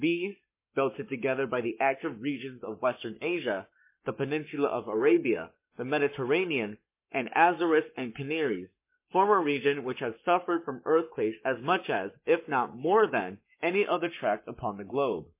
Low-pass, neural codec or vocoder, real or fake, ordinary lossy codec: 3.6 kHz; codec, 44.1 kHz, 7.8 kbps, Pupu-Codec; fake; MP3, 24 kbps